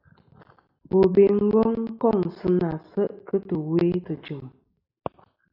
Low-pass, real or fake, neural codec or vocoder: 5.4 kHz; real; none